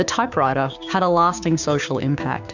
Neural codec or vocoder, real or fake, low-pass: codec, 16 kHz, 6 kbps, DAC; fake; 7.2 kHz